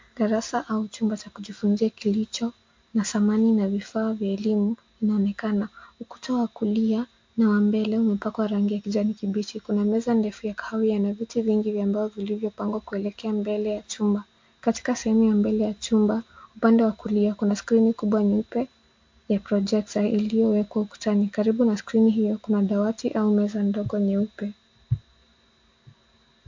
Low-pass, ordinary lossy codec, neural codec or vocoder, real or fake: 7.2 kHz; MP3, 48 kbps; none; real